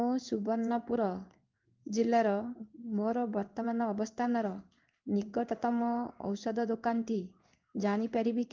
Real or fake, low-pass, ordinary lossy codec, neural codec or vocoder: fake; 7.2 kHz; Opus, 24 kbps; codec, 16 kHz in and 24 kHz out, 1 kbps, XY-Tokenizer